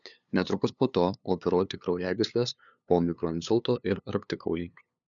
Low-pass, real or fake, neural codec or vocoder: 7.2 kHz; fake; codec, 16 kHz, 2 kbps, FunCodec, trained on LibriTTS, 25 frames a second